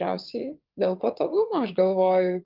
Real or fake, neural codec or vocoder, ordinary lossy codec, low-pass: fake; vocoder, 22.05 kHz, 80 mel bands, WaveNeXt; Opus, 32 kbps; 5.4 kHz